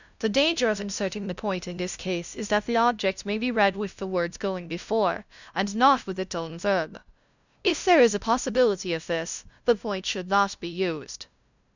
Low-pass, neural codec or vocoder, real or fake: 7.2 kHz; codec, 16 kHz, 0.5 kbps, FunCodec, trained on LibriTTS, 25 frames a second; fake